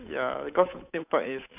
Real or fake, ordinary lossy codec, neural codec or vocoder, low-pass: fake; none; codec, 16 kHz, 8 kbps, FunCodec, trained on Chinese and English, 25 frames a second; 3.6 kHz